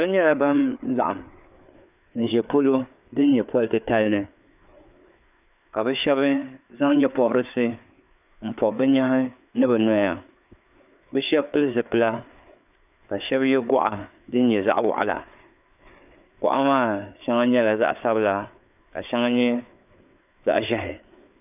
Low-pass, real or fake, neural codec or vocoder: 3.6 kHz; fake; codec, 16 kHz, 4 kbps, FreqCodec, larger model